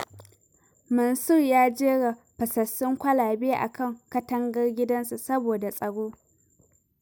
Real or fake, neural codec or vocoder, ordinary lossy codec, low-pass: real; none; none; none